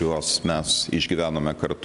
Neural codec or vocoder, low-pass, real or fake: none; 10.8 kHz; real